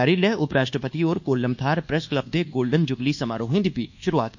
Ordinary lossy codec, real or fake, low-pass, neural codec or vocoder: none; fake; 7.2 kHz; codec, 24 kHz, 1.2 kbps, DualCodec